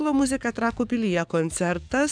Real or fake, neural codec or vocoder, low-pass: fake; codec, 44.1 kHz, 7.8 kbps, DAC; 9.9 kHz